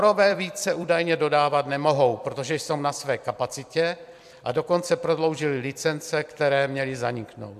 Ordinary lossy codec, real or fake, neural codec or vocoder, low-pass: MP3, 96 kbps; fake; vocoder, 44.1 kHz, 128 mel bands every 256 samples, BigVGAN v2; 14.4 kHz